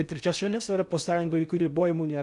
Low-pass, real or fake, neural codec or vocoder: 10.8 kHz; fake; codec, 16 kHz in and 24 kHz out, 0.6 kbps, FocalCodec, streaming, 4096 codes